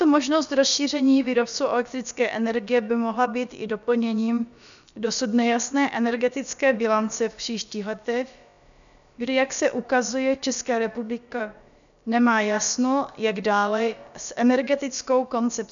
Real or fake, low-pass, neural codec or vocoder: fake; 7.2 kHz; codec, 16 kHz, about 1 kbps, DyCAST, with the encoder's durations